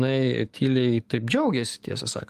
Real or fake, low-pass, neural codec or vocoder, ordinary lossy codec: fake; 14.4 kHz; codec, 44.1 kHz, 7.8 kbps, DAC; Opus, 32 kbps